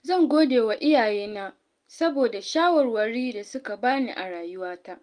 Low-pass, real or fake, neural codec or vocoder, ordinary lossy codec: 9.9 kHz; real; none; Opus, 32 kbps